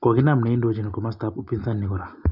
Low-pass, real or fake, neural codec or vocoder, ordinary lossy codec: 5.4 kHz; real; none; none